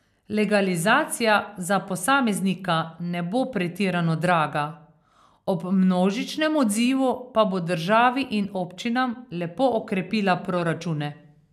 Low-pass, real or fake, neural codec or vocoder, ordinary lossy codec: 14.4 kHz; real; none; none